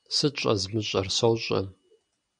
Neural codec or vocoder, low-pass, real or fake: none; 9.9 kHz; real